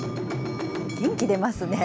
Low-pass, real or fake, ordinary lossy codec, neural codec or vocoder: none; real; none; none